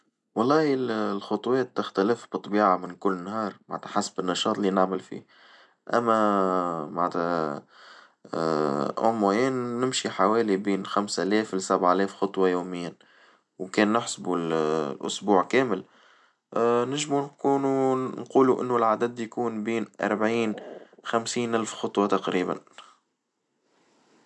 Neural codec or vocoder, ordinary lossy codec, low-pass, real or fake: none; none; 10.8 kHz; real